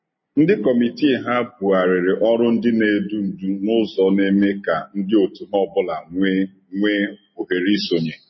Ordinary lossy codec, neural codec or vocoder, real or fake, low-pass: MP3, 24 kbps; none; real; 7.2 kHz